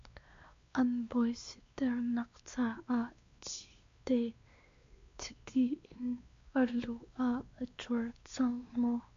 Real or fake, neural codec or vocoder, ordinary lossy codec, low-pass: fake; codec, 16 kHz, 2 kbps, X-Codec, WavLM features, trained on Multilingual LibriSpeech; MP3, 48 kbps; 7.2 kHz